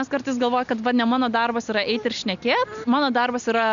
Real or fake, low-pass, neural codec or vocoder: real; 7.2 kHz; none